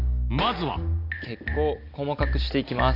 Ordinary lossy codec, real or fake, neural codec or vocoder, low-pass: none; real; none; 5.4 kHz